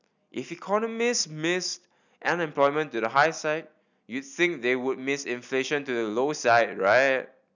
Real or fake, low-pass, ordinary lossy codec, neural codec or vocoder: real; 7.2 kHz; none; none